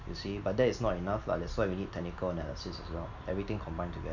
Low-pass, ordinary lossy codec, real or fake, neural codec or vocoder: 7.2 kHz; none; real; none